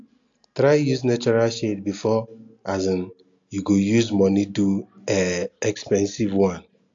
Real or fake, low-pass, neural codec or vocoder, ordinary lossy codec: real; 7.2 kHz; none; AAC, 64 kbps